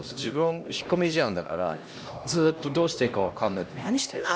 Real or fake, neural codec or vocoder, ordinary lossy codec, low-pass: fake; codec, 16 kHz, 1 kbps, X-Codec, HuBERT features, trained on LibriSpeech; none; none